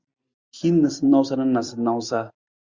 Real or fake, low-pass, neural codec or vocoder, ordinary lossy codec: fake; 7.2 kHz; vocoder, 44.1 kHz, 128 mel bands every 256 samples, BigVGAN v2; Opus, 64 kbps